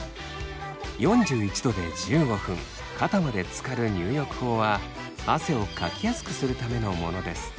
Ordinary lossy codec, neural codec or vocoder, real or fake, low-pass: none; none; real; none